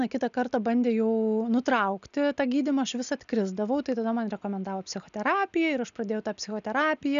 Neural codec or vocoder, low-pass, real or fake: none; 7.2 kHz; real